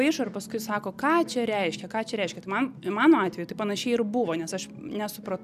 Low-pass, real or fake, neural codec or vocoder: 14.4 kHz; real; none